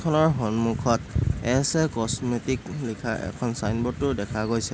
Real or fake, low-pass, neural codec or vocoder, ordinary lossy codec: real; none; none; none